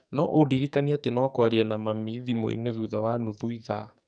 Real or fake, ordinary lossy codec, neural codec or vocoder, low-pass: fake; none; codec, 44.1 kHz, 2.6 kbps, SNAC; 9.9 kHz